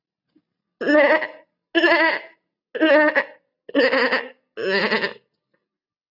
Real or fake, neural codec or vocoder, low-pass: real; none; 5.4 kHz